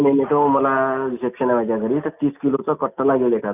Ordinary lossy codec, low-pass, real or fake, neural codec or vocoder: none; 3.6 kHz; real; none